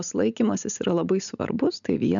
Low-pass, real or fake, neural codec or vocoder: 7.2 kHz; real; none